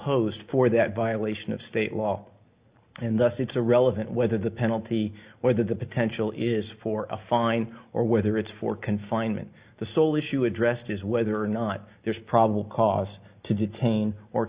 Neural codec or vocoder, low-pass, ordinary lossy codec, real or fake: none; 3.6 kHz; Opus, 64 kbps; real